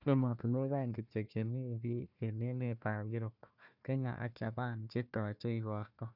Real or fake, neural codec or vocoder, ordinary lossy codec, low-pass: fake; codec, 16 kHz, 1 kbps, FunCodec, trained on Chinese and English, 50 frames a second; none; 5.4 kHz